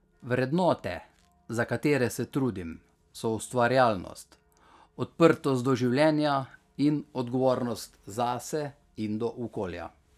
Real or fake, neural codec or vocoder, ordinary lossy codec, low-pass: real; none; none; 14.4 kHz